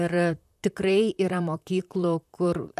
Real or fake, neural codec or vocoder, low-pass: fake; vocoder, 44.1 kHz, 128 mel bands, Pupu-Vocoder; 14.4 kHz